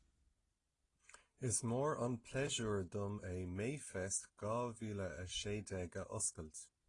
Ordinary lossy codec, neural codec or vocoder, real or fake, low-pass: AAC, 32 kbps; none; real; 10.8 kHz